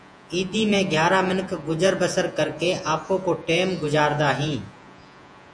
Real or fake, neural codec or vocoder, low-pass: fake; vocoder, 48 kHz, 128 mel bands, Vocos; 9.9 kHz